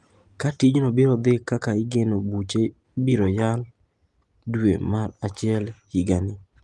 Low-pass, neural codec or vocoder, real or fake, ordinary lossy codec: 10.8 kHz; none; real; Opus, 24 kbps